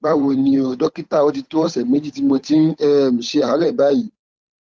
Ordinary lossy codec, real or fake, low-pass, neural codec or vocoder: none; fake; none; codec, 16 kHz, 8 kbps, FunCodec, trained on Chinese and English, 25 frames a second